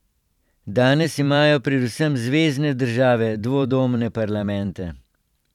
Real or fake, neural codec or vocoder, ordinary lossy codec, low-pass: fake; vocoder, 44.1 kHz, 128 mel bands every 512 samples, BigVGAN v2; none; 19.8 kHz